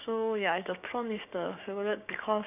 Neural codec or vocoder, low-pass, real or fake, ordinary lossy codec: codec, 16 kHz, 6 kbps, DAC; 3.6 kHz; fake; none